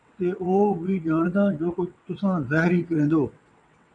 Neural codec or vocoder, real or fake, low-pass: vocoder, 22.05 kHz, 80 mel bands, WaveNeXt; fake; 9.9 kHz